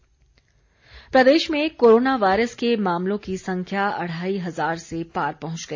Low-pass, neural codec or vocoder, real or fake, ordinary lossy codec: 7.2 kHz; none; real; AAC, 48 kbps